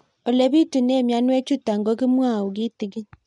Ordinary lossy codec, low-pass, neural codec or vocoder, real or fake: MP3, 64 kbps; 10.8 kHz; none; real